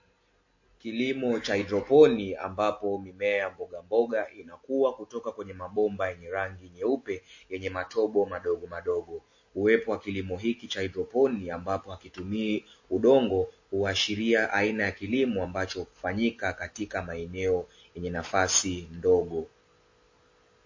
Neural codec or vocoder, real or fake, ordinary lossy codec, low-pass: none; real; MP3, 32 kbps; 7.2 kHz